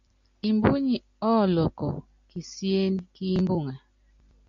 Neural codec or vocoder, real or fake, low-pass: none; real; 7.2 kHz